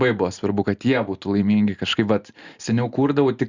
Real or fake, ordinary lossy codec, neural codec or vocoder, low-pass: real; Opus, 64 kbps; none; 7.2 kHz